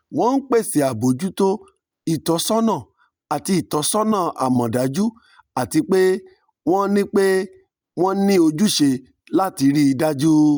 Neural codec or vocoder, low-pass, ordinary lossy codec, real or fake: none; none; none; real